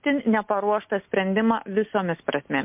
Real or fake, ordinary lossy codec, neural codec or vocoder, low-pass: real; MP3, 32 kbps; none; 3.6 kHz